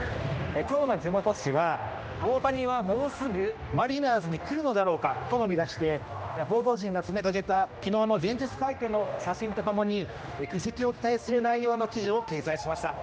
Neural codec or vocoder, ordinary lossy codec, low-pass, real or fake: codec, 16 kHz, 1 kbps, X-Codec, HuBERT features, trained on general audio; none; none; fake